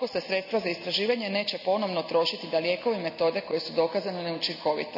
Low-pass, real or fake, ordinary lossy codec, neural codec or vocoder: 5.4 kHz; real; none; none